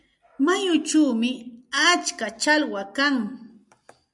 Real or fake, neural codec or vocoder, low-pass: real; none; 10.8 kHz